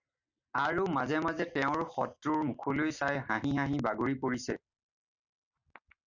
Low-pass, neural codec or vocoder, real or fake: 7.2 kHz; none; real